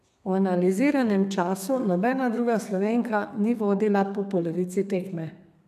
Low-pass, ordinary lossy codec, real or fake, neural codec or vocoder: 14.4 kHz; none; fake; codec, 32 kHz, 1.9 kbps, SNAC